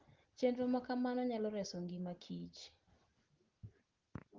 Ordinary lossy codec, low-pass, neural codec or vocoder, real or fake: Opus, 16 kbps; 7.2 kHz; none; real